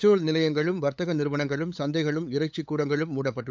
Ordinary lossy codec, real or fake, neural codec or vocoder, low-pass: none; fake; codec, 16 kHz, 8 kbps, FunCodec, trained on LibriTTS, 25 frames a second; none